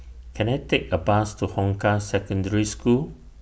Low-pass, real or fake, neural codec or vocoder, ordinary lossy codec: none; real; none; none